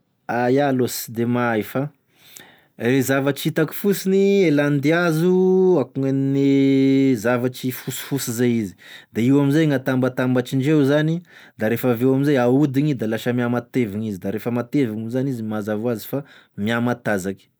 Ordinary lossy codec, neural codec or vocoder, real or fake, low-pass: none; none; real; none